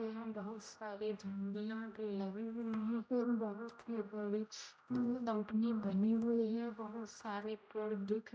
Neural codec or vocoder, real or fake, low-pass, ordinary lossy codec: codec, 16 kHz, 0.5 kbps, X-Codec, HuBERT features, trained on general audio; fake; none; none